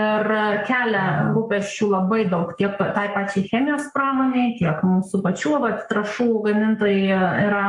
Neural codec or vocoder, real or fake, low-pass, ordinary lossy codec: codec, 44.1 kHz, 7.8 kbps, Pupu-Codec; fake; 10.8 kHz; MP3, 64 kbps